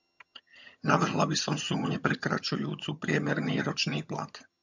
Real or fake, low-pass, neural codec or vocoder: fake; 7.2 kHz; vocoder, 22.05 kHz, 80 mel bands, HiFi-GAN